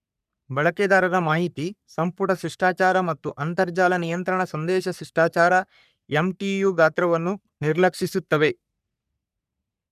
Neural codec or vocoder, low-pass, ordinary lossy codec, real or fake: codec, 44.1 kHz, 3.4 kbps, Pupu-Codec; 14.4 kHz; none; fake